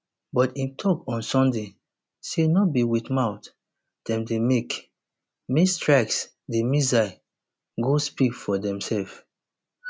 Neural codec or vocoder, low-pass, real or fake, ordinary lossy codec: none; none; real; none